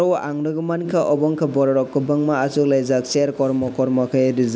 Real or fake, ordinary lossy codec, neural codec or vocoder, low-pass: real; none; none; none